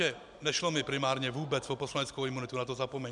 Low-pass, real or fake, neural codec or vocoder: 10.8 kHz; real; none